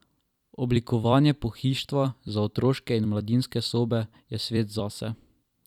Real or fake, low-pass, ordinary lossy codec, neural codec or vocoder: fake; 19.8 kHz; none; vocoder, 44.1 kHz, 128 mel bands every 256 samples, BigVGAN v2